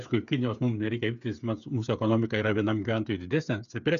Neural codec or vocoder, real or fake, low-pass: codec, 16 kHz, 8 kbps, FreqCodec, smaller model; fake; 7.2 kHz